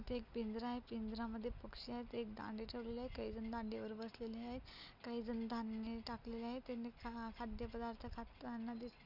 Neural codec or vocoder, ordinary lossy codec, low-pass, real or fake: none; none; 5.4 kHz; real